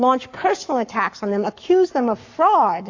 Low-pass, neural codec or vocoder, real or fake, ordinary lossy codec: 7.2 kHz; codec, 44.1 kHz, 7.8 kbps, Pupu-Codec; fake; AAC, 48 kbps